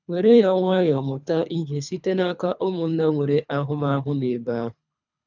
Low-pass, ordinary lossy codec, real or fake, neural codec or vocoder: 7.2 kHz; none; fake; codec, 24 kHz, 3 kbps, HILCodec